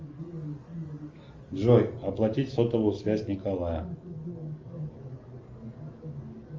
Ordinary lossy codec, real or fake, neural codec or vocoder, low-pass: Opus, 32 kbps; real; none; 7.2 kHz